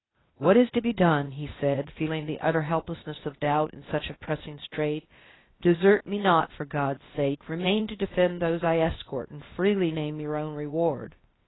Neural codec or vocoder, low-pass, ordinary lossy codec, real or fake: codec, 16 kHz, 0.8 kbps, ZipCodec; 7.2 kHz; AAC, 16 kbps; fake